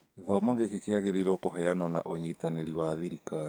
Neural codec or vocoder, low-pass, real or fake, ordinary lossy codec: codec, 44.1 kHz, 2.6 kbps, SNAC; none; fake; none